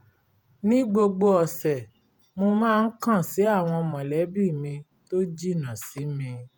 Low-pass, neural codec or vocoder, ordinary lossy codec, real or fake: none; vocoder, 48 kHz, 128 mel bands, Vocos; none; fake